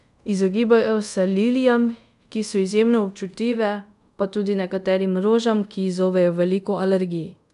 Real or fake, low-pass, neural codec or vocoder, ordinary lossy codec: fake; 10.8 kHz; codec, 24 kHz, 0.5 kbps, DualCodec; none